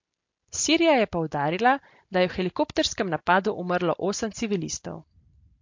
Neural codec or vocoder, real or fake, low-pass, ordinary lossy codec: none; real; 7.2 kHz; MP3, 48 kbps